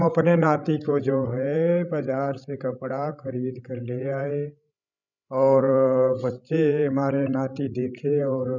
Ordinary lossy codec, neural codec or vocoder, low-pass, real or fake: none; codec, 16 kHz, 16 kbps, FreqCodec, larger model; 7.2 kHz; fake